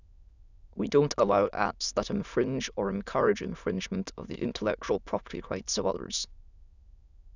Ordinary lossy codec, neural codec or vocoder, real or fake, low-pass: none; autoencoder, 22.05 kHz, a latent of 192 numbers a frame, VITS, trained on many speakers; fake; 7.2 kHz